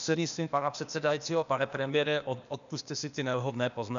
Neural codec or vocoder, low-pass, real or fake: codec, 16 kHz, 0.8 kbps, ZipCodec; 7.2 kHz; fake